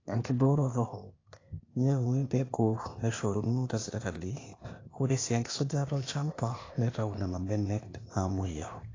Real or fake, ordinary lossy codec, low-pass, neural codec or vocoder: fake; AAC, 32 kbps; 7.2 kHz; codec, 16 kHz, 0.8 kbps, ZipCodec